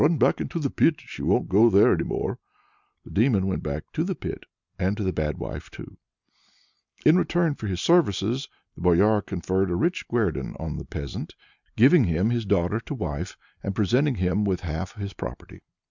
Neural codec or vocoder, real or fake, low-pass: none; real; 7.2 kHz